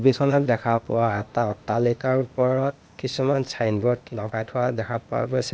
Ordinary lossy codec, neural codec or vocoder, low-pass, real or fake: none; codec, 16 kHz, 0.8 kbps, ZipCodec; none; fake